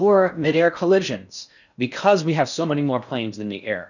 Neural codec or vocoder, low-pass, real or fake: codec, 16 kHz in and 24 kHz out, 0.6 kbps, FocalCodec, streaming, 4096 codes; 7.2 kHz; fake